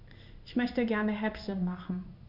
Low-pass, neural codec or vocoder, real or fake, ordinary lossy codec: 5.4 kHz; codec, 16 kHz in and 24 kHz out, 1 kbps, XY-Tokenizer; fake; none